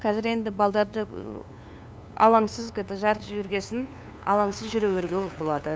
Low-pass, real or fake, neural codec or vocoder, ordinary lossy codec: none; fake; codec, 16 kHz, 2 kbps, FunCodec, trained on LibriTTS, 25 frames a second; none